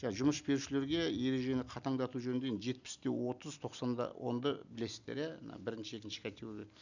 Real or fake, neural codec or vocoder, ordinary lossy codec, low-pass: real; none; none; 7.2 kHz